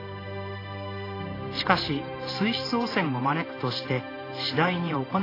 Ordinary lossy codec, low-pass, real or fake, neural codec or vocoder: AAC, 24 kbps; 5.4 kHz; fake; vocoder, 44.1 kHz, 128 mel bands every 512 samples, BigVGAN v2